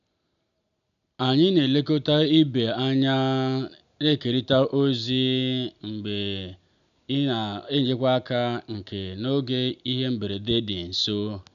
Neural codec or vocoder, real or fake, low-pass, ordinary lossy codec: none; real; 7.2 kHz; none